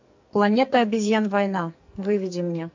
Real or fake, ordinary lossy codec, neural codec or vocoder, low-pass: fake; MP3, 48 kbps; codec, 44.1 kHz, 2.6 kbps, SNAC; 7.2 kHz